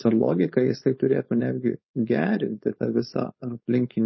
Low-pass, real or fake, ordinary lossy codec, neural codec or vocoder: 7.2 kHz; fake; MP3, 24 kbps; codec, 16 kHz, 4.8 kbps, FACodec